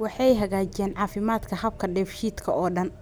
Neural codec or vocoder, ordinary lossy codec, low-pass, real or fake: none; none; none; real